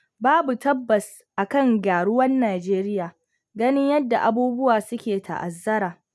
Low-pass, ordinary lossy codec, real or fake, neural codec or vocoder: none; none; real; none